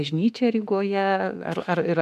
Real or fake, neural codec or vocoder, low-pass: fake; autoencoder, 48 kHz, 32 numbers a frame, DAC-VAE, trained on Japanese speech; 14.4 kHz